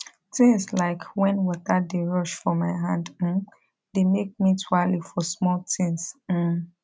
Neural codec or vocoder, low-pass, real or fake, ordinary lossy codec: none; none; real; none